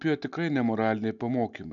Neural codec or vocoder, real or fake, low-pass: none; real; 7.2 kHz